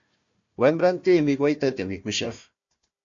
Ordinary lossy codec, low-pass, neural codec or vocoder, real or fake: AAC, 48 kbps; 7.2 kHz; codec, 16 kHz, 1 kbps, FunCodec, trained on Chinese and English, 50 frames a second; fake